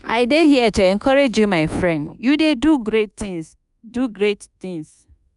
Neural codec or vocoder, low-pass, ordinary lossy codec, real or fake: codec, 24 kHz, 1.2 kbps, DualCodec; 10.8 kHz; none; fake